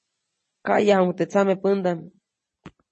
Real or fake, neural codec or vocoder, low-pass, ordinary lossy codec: real; none; 10.8 kHz; MP3, 32 kbps